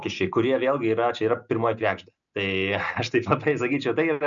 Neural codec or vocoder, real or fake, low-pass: none; real; 7.2 kHz